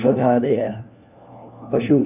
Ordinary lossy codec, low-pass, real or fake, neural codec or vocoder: none; 3.6 kHz; fake; codec, 16 kHz, 1 kbps, FunCodec, trained on LibriTTS, 50 frames a second